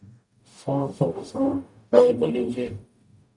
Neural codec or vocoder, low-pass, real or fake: codec, 44.1 kHz, 0.9 kbps, DAC; 10.8 kHz; fake